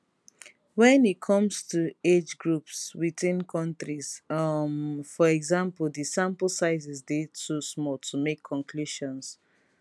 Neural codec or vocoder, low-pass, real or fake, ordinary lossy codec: none; none; real; none